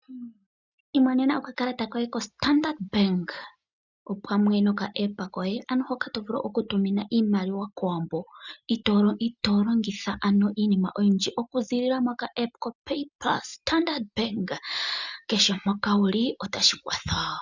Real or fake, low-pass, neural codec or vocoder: real; 7.2 kHz; none